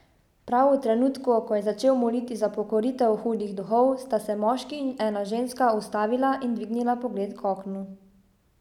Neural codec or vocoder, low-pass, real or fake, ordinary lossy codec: none; 19.8 kHz; real; none